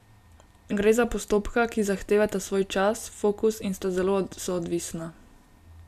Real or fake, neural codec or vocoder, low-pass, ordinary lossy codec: real; none; 14.4 kHz; none